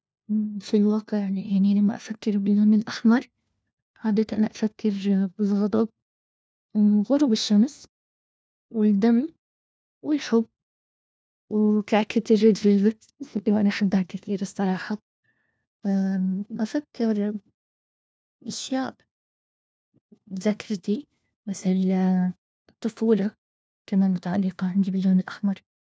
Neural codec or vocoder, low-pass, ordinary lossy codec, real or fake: codec, 16 kHz, 1 kbps, FunCodec, trained on LibriTTS, 50 frames a second; none; none; fake